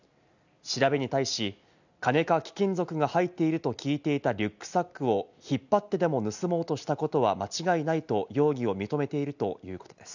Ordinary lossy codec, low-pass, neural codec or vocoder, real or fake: none; 7.2 kHz; none; real